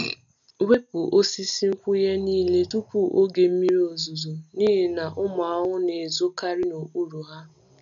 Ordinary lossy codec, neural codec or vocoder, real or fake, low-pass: none; none; real; 7.2 kHz